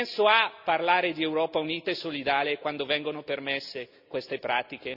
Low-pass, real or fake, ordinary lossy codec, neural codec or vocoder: 5.4 kHz; real; none; none